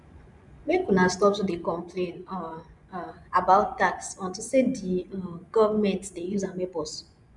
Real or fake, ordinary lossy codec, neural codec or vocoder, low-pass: fake; none; vocoder, 24 kHz, 100 mel bands, Vocos; 10.8 kHz